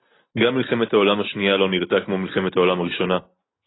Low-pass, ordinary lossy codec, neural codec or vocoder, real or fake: 7.2 kHz; AAC, 16 kbps; none; real